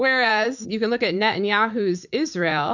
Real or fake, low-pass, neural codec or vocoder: fake; 7.2 kHz; vocoder, 44.1 kHz, 80 mel bands, Vocos